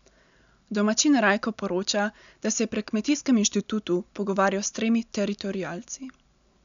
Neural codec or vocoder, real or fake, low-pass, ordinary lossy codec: none; real; 7.2 kHz; MP3, 96 kbps